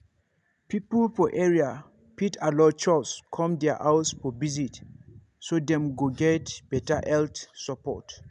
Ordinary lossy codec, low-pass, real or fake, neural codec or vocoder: none; 10.8 kHz; real; none